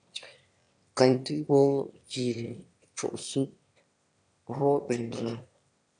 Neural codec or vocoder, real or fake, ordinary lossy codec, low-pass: autoencoder, 22.05 kHz, a latent of 192 numbers a frame, VITS, trained on one speaker; fake; MP3, 96 kbps; 9.9 kHz